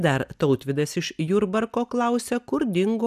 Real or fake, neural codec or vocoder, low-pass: real; none; 14.4 kHz